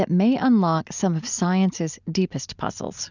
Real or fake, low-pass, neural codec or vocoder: real; 7.2 kHz; none